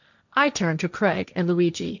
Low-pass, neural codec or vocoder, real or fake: 7.2 kHz; codec, 16 kHz, 1.1 kbps, Voila-Tokenizer; fake